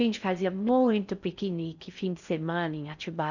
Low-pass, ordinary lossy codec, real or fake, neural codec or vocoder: 7.2 kHz; none; fake; codec, 16 kHz in and 24 kHz out, 0.6 kbps, FocalCodec, streaming, 4096 codes